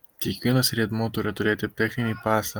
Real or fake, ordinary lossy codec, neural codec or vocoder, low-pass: real; Opus, 32 kbps; none; 19.8 kHz